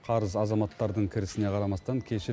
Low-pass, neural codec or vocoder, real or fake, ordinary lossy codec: none; none; real; none